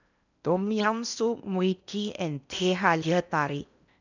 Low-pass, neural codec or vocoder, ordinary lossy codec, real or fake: 7.2 kHz; codec, 16 kHz in and 24 kHz out, 0.8 kbps, FocalCodec, streaming, 65536 codes; none; fake